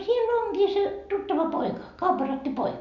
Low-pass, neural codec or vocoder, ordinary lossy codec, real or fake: 7.2 kHz; none; none; real